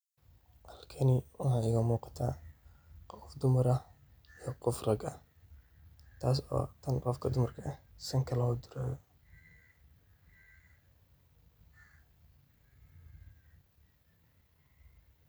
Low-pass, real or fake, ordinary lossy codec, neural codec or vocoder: none; real; none; none